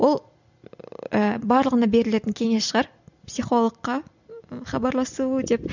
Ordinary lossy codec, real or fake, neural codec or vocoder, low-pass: none; real; none; 7.2 kHz